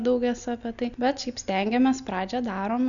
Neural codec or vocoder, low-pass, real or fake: none; 7.2 kHz; real